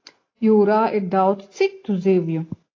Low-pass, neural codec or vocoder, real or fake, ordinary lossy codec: 7.2 kHz; none; real; AAC, 32 kbps